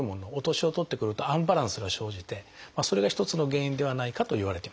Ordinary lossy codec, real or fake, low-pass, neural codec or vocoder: none; real; none; none